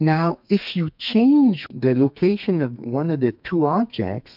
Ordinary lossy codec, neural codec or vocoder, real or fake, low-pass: MP3, 48 kbps; codec, 32 kHz, 1.9 kbps, SNAC; fake; 5.4 kHz